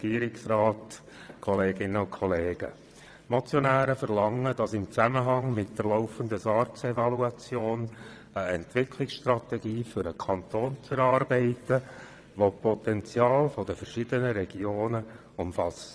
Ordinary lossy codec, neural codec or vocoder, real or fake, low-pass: none; vocoder, 22.05 kHz, 80 mel bands, WaveNeXt; fake; none